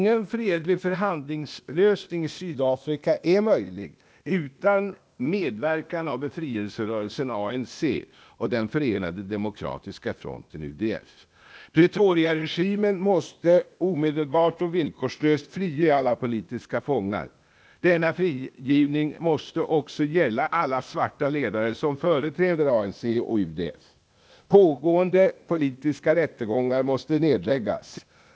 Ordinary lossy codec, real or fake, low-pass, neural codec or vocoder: none; fake; none; codec, 16 kHz, 0.8 kbps, ZipCodec